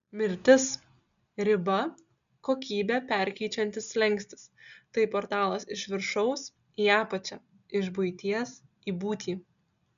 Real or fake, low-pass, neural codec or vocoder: real; 7.2 kHz; none